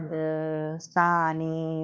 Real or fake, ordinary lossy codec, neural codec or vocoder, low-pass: fake; none; codec, 16 kHz, 2 kbps, X-Codec, WavLM features, trained on Multilingual LibriSpeech; none